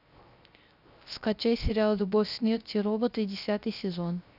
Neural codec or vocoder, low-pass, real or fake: codec, 16 kHz, 0.3 kbps, FocalCodec; 5.4 kHz; fake